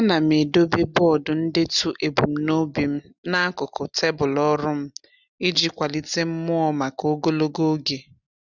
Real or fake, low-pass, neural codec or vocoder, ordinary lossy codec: real; 7.2 kHz; none; none